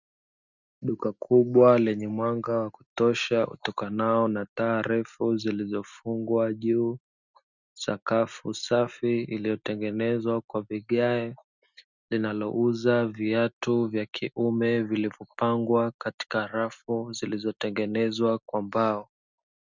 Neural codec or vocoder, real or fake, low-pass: none; real; 7.2 kHz